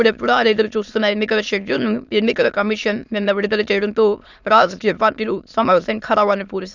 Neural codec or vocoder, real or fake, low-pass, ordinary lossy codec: autoencoder, 22.05 kHz, a latent of 192 numbers a frame, VITS, trained on many speakers; fake; 7.2 kHz; none